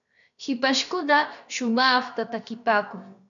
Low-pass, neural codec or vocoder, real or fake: 7.2 kHz; codec, 16 kHz, 0.7 kbps, FocalCodec; fake